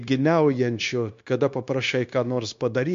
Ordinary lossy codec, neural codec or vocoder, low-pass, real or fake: MP3, 48 kbps; codec, 16 kHz, 0.9 kbps, LongCat-Audio-Codec; 7.2 kHz; fake